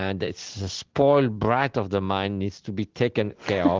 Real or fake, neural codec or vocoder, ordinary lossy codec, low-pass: real; none; Opus, 16 kbps; 7.2 kHz